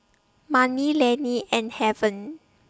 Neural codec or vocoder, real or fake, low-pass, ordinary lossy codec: none; real; none; none